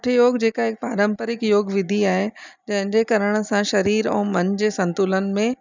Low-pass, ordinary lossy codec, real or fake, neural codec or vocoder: 7.2 kHz; none; real; none